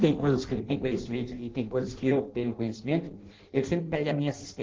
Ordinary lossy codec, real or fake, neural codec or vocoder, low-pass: Opus, 16 kbps; fake; codec, 16 kHz in and 24 kHz out, 0.6 kbps, FireRedTTS-2 codec; 7.2 kHz